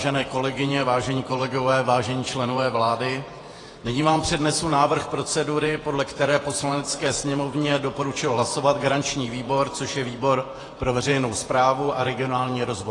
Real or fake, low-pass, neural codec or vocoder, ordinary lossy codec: fake; 10.8 kHz; vocoder, 48 kHz, 128 mel bands, Vocos; AAC, 32 kbps